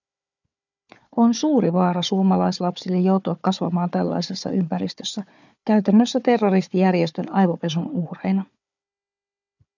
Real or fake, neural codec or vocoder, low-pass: fake; codec, 16 kHz, 4 kbps, FunCodec, trained on Chinese and English, 50 frames a second; 7.2 kHz